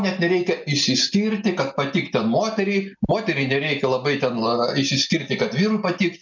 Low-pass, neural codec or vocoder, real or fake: 7.2 kHz; none; real